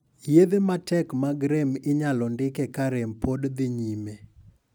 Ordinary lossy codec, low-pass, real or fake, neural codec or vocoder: none; none; real; none